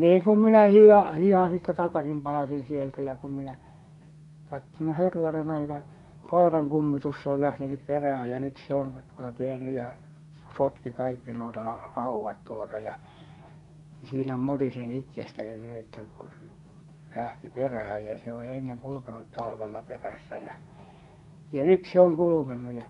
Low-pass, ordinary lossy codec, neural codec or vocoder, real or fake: 10.8 kHz; none; codec, 24 kHz, 1 kbps, SNAC; fake